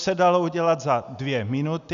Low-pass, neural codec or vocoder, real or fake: 7.2 kHz; none; real